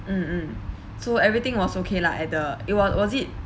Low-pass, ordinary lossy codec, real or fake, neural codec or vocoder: none; none; real; none